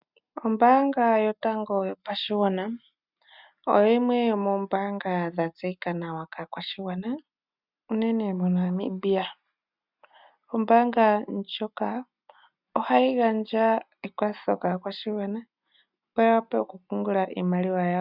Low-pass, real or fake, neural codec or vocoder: 5.4 kHz; real; none